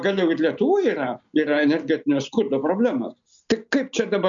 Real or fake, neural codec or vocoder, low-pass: real; none; 7.2 kHz